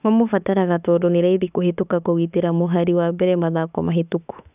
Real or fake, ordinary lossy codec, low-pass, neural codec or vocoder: fake; none; 3.6 kHz; autoencoder, 48 kHz, 32 numbers a frame, DAC-VAE, trained on Japanese speech